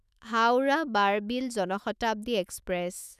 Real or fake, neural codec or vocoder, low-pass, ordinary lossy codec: fake; autoencoder, 48 kHz, 128 numbers a frame, DAC-VAE, trained on Japanese speech; 14.4 kHz; none